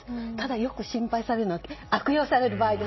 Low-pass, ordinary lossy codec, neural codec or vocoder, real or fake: 7.2 kHz; MP3, 24 kbps; none; real